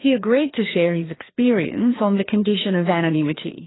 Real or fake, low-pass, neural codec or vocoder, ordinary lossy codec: fake; 7.2 kHz; codec, 16 kHz, 2 kbps, FreqCodec, larger model; AAC, 16 kbps